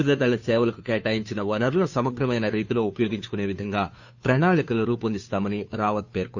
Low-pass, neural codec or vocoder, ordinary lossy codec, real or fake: 7.2 kHz; codec, 16 kHz, 2 kbps, FunCodec, trained on Chinese and English, 25 frames a second; none; fake